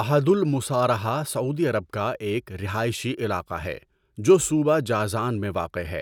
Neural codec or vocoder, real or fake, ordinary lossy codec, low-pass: none; real; none; 19.8 kHz